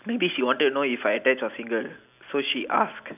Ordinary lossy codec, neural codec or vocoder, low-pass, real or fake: none; none; 3.6 kHz; real